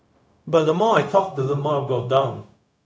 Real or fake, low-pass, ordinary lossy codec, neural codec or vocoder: fake; none; none; codec, 16 kHz, 0.4 kbps, LongCat-Audio-Codec